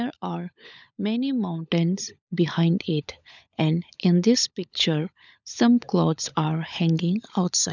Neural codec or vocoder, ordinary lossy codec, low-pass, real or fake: codec, 16 kHz, 16 kbps, FunCodec, trained on LibriTTS, 50 frames a second; none; 7.2 kHz; fake